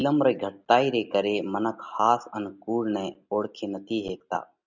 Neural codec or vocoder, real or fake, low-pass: none; real; 7.2 kHz